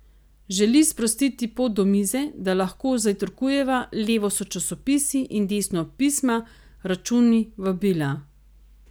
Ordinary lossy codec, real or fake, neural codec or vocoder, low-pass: none; real; none; none